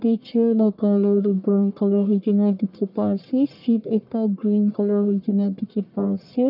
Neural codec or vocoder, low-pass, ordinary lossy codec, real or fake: codec, 44.1 kHz, 1.7 kbps, Pupu-Codec; 5.4 kHz; none; fake